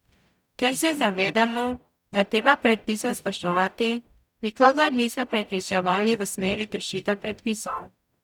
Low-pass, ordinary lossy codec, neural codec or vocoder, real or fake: 19.8 kHz; none; codec, 44.1 kHz, 0.9 kbps, DAC; fake